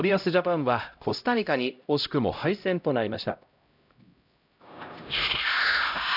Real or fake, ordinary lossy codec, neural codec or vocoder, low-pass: fake; none; codec, 16 kHz, 0.5 kbps, X-Codec, HuBERT features, trained on LibriSpeech; 5.4 kHz